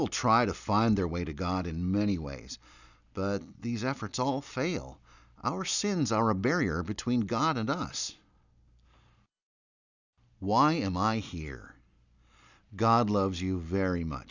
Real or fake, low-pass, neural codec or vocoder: real; 7.2 kHz; none